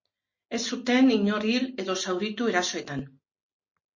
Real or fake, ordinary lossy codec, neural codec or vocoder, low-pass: real; MP3, 32 kbps; none; 7.2 kHz